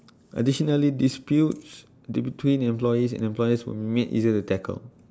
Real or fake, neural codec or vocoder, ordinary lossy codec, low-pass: real; none; none; none